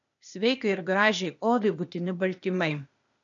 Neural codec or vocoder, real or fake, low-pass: codec, 16 kHz, 0.8 kbps, ZipCodec; fake; 7.2 kHz